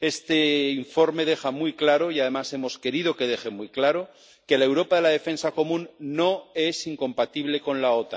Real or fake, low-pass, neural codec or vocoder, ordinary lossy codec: real; none; none; none